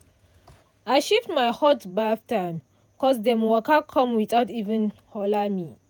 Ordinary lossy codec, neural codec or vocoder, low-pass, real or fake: none; vocoder, 48 kHz, 128 mel bands, Vocos; none; fake